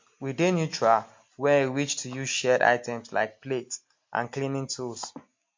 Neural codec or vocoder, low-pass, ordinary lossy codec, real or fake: none; 7.2 kHz; MP3, 48 kbps; real